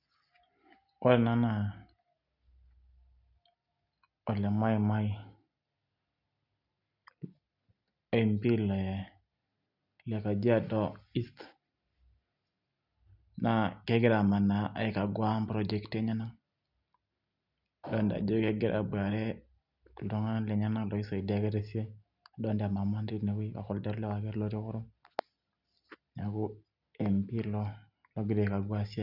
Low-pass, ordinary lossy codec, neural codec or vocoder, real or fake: 5.4 kHz; none; none; real